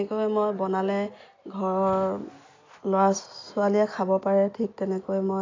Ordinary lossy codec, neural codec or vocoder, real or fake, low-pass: AAC, 32 kbps; none; real; 7.2 kHz